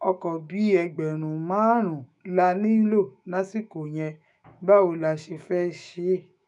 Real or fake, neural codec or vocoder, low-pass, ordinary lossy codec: fake; autoencoder, 48 kHz, 128 numbers a frame, DAC-VAE, trained on Japanese speech; 10.8 kHz; none